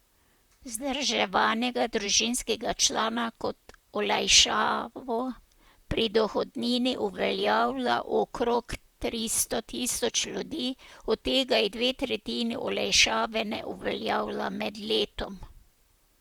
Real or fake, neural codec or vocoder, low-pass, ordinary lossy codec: fake; vocoder, 44.1 kHz, 128 mel bands, Pupu-Vocoder; 19.8 kHz; Opus, 64 kbps